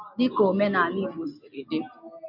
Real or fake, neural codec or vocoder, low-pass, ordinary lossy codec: real; none; 5.4 kHz; MP3, 48 kbps